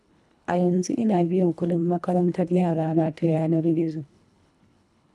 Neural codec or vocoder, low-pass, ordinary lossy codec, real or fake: codec, 24 kHz, 1.5 kbps, HILCodec; none; none; fake